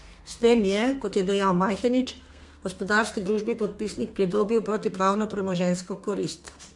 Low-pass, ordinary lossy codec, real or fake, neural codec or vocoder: 10.8 kHz; MP3, 64 kbps; fake; codec, 32 kHz, 1.9 kbps, SNAC